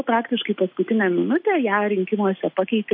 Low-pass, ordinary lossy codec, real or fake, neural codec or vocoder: 5.4 kHz; MP3, 32 kbps; real; none